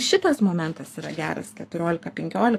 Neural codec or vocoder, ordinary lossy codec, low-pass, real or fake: codec, 44.1 kHz, 7.8 kbps, Pupu-Codec; AAC, 64 kbps; 14.4 kHz; fake